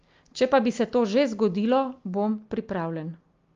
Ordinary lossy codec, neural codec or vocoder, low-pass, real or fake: Opus, 32 kbps; none; 7.2 kHz; real